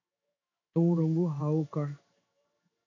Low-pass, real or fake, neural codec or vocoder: 7.2 kHz; fake; codec, 16 kHz in and 24 kHz out, 1 kbps, XY-Tokenizer